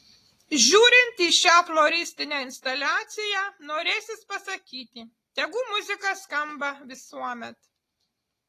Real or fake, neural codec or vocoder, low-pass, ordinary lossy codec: real; none; 14.4 kHz; AAC, 48 kbps